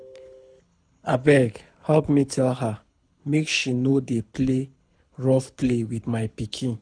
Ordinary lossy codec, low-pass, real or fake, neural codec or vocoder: none; 9.9 kHz; fake; codec, 24 kHz, 6 kbps, HILCodec